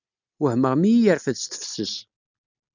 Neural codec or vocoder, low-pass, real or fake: none; 7.2 kHz; real